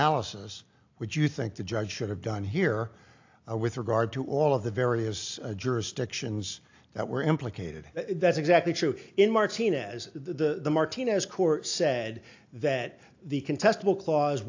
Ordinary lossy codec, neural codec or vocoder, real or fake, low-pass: AAC, 48 kbps; none; real; 7.2 kHz